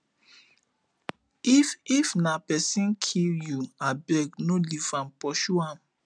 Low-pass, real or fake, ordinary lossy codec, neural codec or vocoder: 9.9 kHz; real; none; none